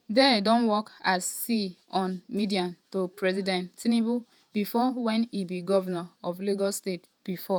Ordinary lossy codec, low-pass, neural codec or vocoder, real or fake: none; none; vocoder, 48 kHz, 128 mel bands, Vocos; fake